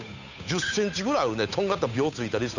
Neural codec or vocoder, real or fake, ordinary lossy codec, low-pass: codec, 16 kHz, 8 kbps, FunCodec, trained on Chinese and English, 25 frames a second; fake; none; 7.2 kHz